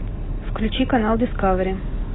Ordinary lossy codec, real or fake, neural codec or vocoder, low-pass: AAC, 16 kbps; real; none; 7.2 kHz